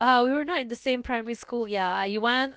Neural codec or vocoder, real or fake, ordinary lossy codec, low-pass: codec, 16 kHz, about 1 kbps, DyCAST, with the encoder's durations; fake; none; none